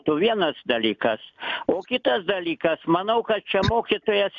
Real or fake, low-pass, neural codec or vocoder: real; 7.2 kHz; none